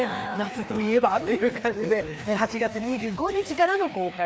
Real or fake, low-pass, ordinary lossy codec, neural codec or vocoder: fake; none; none; codec, 16 kHz, 2 kbps, FreqCodec, larger model